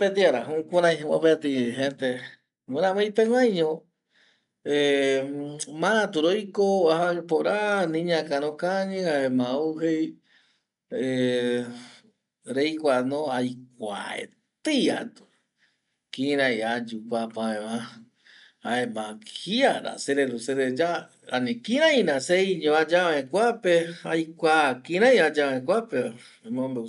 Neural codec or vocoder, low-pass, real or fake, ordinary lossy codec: none; 10.8 kHz; real; none